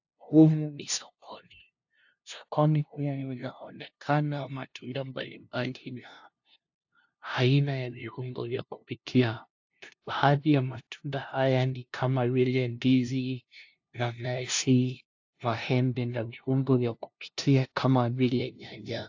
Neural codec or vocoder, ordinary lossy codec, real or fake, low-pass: codec, 16 kHz, 0.5 kbps, FunCodec, trained on LibriTTS, 25 frames a second; AAC, 48 kbps; fake; 7.2 kHz